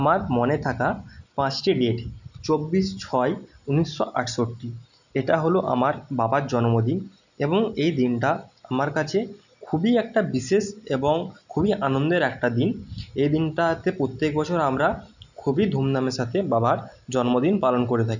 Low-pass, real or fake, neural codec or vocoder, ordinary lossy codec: 7.2 kHz; real; none; none